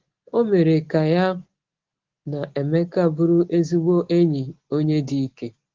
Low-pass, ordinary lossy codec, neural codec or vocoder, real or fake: 7.2 kHz; Opus, 16 kbps; none; real